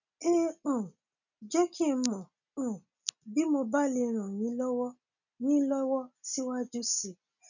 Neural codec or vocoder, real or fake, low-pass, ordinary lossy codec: none; real; 7.2 kHz; none